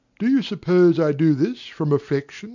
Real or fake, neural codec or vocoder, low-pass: real; none; 7.2 kHz